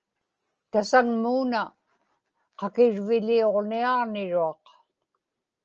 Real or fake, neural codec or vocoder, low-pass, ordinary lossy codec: real; none; 7.2 kHz; Opus, 24 kbps